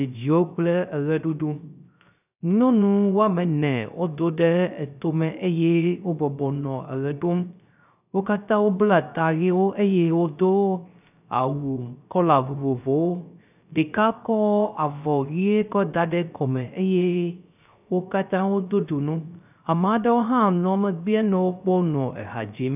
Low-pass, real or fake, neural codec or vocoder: 3.6 kHz; fake; codec, 16 kHz, 0.3 kbps, FocalCodec